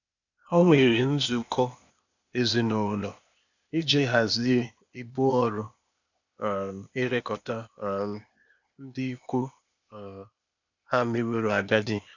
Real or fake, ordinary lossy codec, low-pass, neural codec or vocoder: fake; none; 7.2 kHz; codec, 16 kHz, 0.8 kbps, ZipCodec